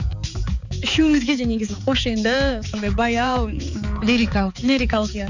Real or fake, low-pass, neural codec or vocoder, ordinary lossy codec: fake; 7.2 kHz; codec, 16 kHz, 4 kbps, X-Codec, HuBERT features, trained on balanced general audio; none